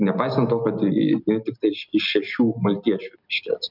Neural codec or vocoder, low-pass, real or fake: none; 5.4 kHz; real